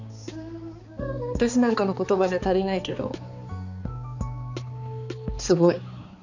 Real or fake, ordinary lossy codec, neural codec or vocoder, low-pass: fake; none; codec, 16 kHz, 4 kbps, X-Codec, HuBERT features, trained on balanced general audio; 7.2 kHz